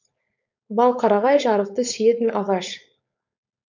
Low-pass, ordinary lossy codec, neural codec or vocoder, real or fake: 7.2 kHz; none; codec, 16 kHz, 4.8 kbps, FACodec; fake